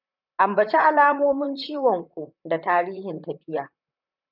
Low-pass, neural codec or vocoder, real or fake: 5.4 kHz; vocoder, 44.1 kHz, 128 mel bands, Pupu-Vocoder; fake